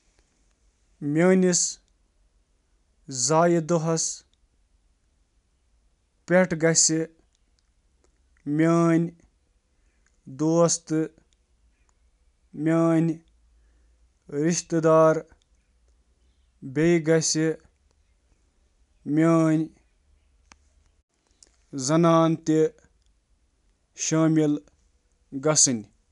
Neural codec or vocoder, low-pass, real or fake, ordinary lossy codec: none; 10.8 kHz; real; none